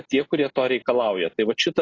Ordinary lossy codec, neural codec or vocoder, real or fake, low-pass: AAC, 32 kbps; none; real; 7.2 kHz